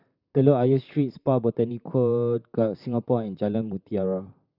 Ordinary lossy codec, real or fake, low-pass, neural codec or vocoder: none; fake; 5.4 kHz; vocoder, 44.1 kHz, 128 mel bands, Pupu-Vocoder